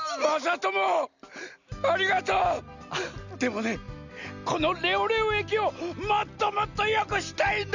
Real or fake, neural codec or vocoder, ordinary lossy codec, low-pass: real; none; none; 7.2 kHz